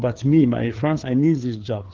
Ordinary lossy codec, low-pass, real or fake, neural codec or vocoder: Opus, 16 kbps; 7.2 kHz; fake; codec, 16 kHz, 4 kbps, FreqCodec, larger model